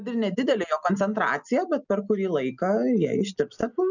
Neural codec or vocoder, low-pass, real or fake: none; 7.2 kHz; real